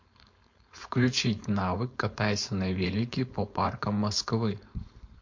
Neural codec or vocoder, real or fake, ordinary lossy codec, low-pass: codec, 16 kHz, 4.8 kbps, FACodec; fake; MP3, 48 kbps; 7.2 kHz